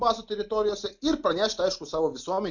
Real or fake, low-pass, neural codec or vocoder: real; 7.2 kHz; none